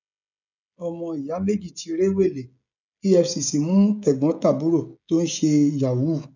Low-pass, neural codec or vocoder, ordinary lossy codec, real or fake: 7.2 kHz; codec, 16 kHz, 16 kbps, FreqCodec, smaller model; none; fake